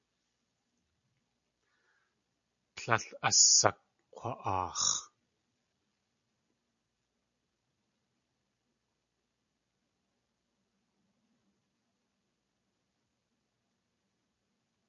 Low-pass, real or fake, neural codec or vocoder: 7.2 kHz; real; none